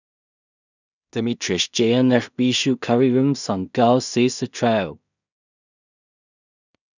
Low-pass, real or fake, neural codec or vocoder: 7.2 kHz; fake; codec, 16 kHz in and 24 kHz out, 0.4 kbps, LongCat-Audio-Codec, two codebook decoder